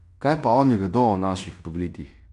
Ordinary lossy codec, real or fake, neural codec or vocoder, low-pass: AAC, 64 kbps; fake; codec, 16 kHz in and 24 kHz out, 0.9 kbps, LongCat-Audio-Codec, fine tuned four codebook decoder; 10.8 kHz